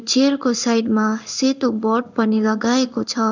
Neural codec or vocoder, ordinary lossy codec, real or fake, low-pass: codec, 16 kHz in and 24 kHz out, 1 kbps, XY-Tokenizer; none; fake; 7.2 kHz